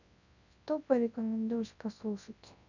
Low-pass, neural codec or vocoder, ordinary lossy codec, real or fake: 7.2 kHz; codec, 24 kHz, 0.9 kbps, WavTokenizer, large speech release; none; fake